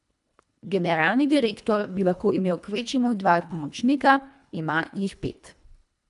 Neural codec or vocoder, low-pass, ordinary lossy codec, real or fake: codec, 24 kHz, 1.5 kbps, HILCodec; 10.8 kHz; none; fake